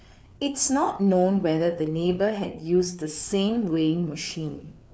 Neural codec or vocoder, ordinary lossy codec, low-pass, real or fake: codec, 16 kHz, 4 kbps, FreqCodec, larger model; none; none; fake